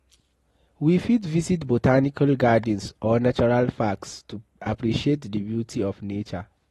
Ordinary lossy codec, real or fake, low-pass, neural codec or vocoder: AAC, 32 kbps; real; 19.8 kHz; none